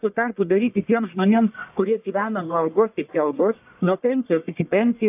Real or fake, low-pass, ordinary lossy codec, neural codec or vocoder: fake; 3.6 kHz; AAC, 32 kbps; codec, 44.1 kHz, 1.7 kbps, Pupu-Codec